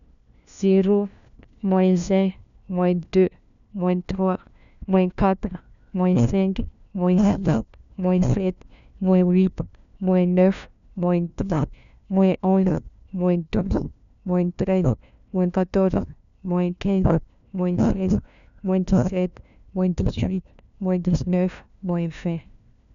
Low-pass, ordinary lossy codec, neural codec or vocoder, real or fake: 7.2 kHz; none; codec, 16 kHz, 1 kbps, FunCodec, trained on LibriTTS, 50 frames a second; fake